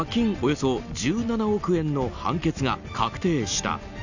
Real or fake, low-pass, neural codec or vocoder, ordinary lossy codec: real; 7.2 kHz; none; none